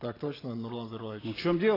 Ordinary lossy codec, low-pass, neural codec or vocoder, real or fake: AAC, 24 kbps; 5.4 kHz; none; real